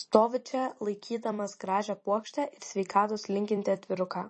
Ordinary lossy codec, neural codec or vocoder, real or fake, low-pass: MP3, 32 kbps; vocoder, 44.1 kHz, 128 mel bands every 256 samples, BigVGAN v2; fake; 10.8 kHz